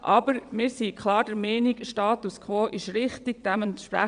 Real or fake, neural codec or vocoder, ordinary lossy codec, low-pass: fake; vocoder, 22.05 kHz, 80 mel bands, WaveNeXt; none; 9.9 kHz